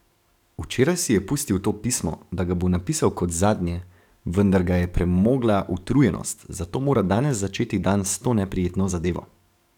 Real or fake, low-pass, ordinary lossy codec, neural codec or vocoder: fake; 19.8 kHz; none; codec, 44.1 kHz, 7.8 kbps, DAC